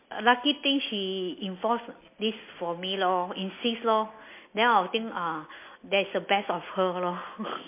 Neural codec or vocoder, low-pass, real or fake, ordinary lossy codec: none; 3.6 kHz; real; MP3, 24 kbps